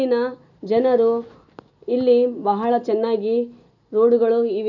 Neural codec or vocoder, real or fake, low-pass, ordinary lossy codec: none; real; 7.2 kHz; none